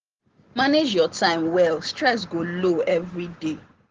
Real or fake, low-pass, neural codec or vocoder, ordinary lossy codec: real; 7.2 kHz; none; Opus, 16 kbps